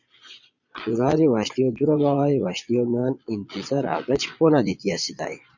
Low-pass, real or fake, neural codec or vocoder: 7.2 kHz; fake; vocoder, 22.05 kHz, 80 mel bands, Vocos